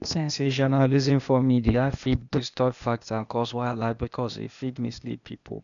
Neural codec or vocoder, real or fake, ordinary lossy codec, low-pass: codec, 16 kHz, 0.8 kbps, ZipCodec; fake; none; 7.2 kHz